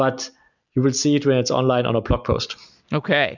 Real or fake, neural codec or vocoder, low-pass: real; none; 7.2 kHz